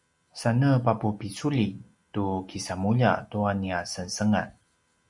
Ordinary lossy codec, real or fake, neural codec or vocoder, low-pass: Opus, 64 kbps; real; none; 10.8 kHz